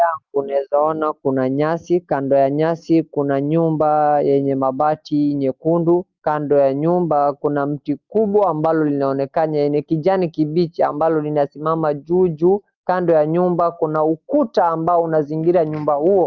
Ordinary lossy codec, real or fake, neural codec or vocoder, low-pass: Opus, 32 kbps; real; none; 7.2 kHz